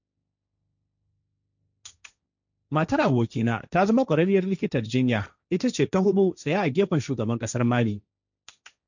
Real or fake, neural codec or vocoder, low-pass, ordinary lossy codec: fake; codec, 16 kHz, 1.1 kbps, Voila-Tokenizer; 7.2 kHz; none